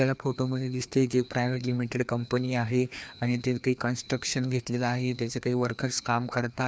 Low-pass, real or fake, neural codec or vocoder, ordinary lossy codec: none; fake; codec, 16 kHz, 2 kbps, FreqCodec, larger model; none